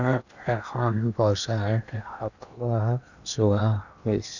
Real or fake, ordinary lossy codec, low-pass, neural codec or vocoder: fake; none; 7.2 kHz; codec, 16 kHz in and 24 kHz out, 0.8 kbps, FocalCodec, streaming, 65536 codes